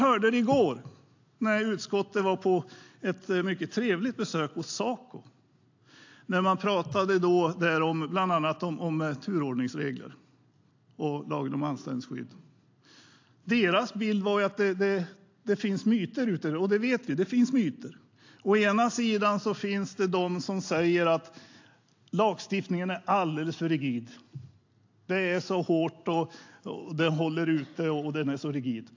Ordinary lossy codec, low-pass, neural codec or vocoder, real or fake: AAC, 48 kbps; 7.2 kHz; none; real